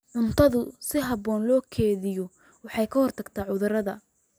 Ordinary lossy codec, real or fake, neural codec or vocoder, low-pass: none; real; none; none